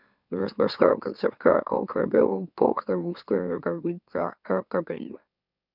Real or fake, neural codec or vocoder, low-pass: fake; autoencoder, 44.1 kHz, a latent of 192 numbers a frame, MeloTTS; 5.4 kHz